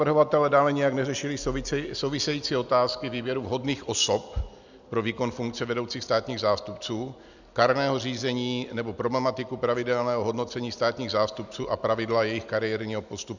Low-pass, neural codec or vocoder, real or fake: 7.2 kHz; none; real